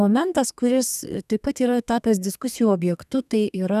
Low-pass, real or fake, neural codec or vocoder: 14.4 kHz; fake; codec, 32 kHz, 1.9 kbps, SNAC